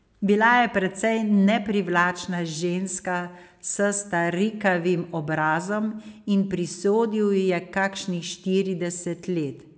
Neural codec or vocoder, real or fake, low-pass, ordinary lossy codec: none; real; none; none